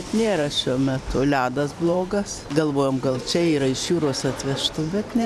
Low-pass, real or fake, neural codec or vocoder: 14.4 kHz; fake; vocoder, 44.1 kHz, 128 mel bands every 512 samples, BigVGAN v2